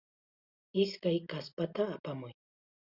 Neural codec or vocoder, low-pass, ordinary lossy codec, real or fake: none; 5.4 kHz; Opus, 64 kbps; real